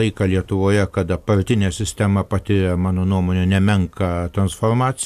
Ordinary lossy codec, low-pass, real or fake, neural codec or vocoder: AAC, 96 kbps; 14.4 kHz; real; none